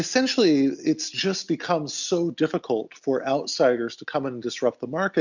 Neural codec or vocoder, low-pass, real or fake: none; 7.2 kHz; real